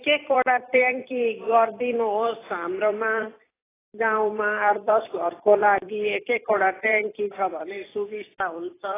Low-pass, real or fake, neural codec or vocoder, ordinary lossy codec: 3.6 kHz; fake; vocoder, 44.1 kHz, 128 mel bands every 256 samples, BigVGAN v2; AAC, 16 kbps